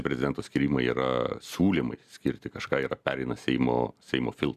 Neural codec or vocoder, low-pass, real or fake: none; 14.4 kHz; real